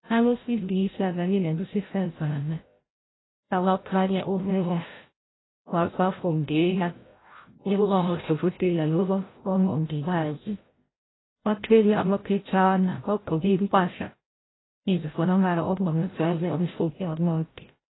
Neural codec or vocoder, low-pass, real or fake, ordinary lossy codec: codec, 16 kHz, 0.5 kbps, FreqCodec, larger model; 7.2 kHz; fake; AAC, 16 kbps